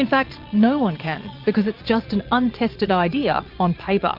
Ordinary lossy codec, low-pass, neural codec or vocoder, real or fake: Opus, 24 kbps; 5.4 kHz; vocoder, 22.05 kHz, 80 mel bands, Vocos; fake